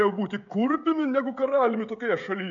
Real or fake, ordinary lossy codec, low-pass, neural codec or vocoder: fake; MP3, 64 kbps; 7.2 kHz; codec, 16 kHz, 16 kbps, FreqCodec, smaller model